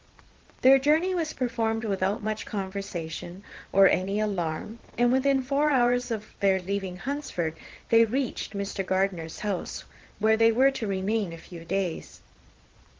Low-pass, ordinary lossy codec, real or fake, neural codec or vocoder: 7.2 kHz; Opus, 16 kbps; fake; vocoder, 22.05 kHz, 80 mel bands, Vocos